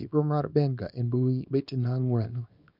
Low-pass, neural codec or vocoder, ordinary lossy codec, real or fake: 5.4 kHz; codec, 24 kHz, 0.9 kbps, WavTokenizer, small release; none; fake